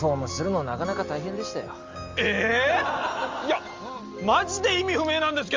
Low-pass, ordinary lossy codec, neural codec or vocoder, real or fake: 7.2 kHz; Opus, 32 kbps; none; real